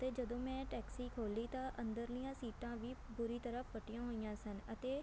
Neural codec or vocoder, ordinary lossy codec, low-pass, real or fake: none; none; none; real